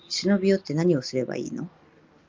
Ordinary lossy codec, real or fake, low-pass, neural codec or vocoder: Opus, 32 kbps; real; 7.2 kHz; none